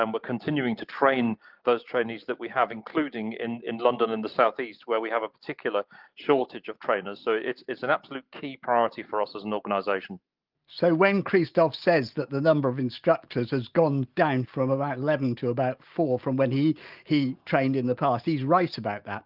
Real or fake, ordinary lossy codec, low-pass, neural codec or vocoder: real; Opus, 32 kbps; 5.4 kHz; none